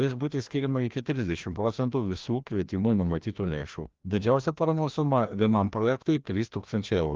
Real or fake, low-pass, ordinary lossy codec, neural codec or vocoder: fake; 7.2 kHz; Opus, 32 kbps; codec, 16 kHz, 1 kbps, FreqCodec, larger model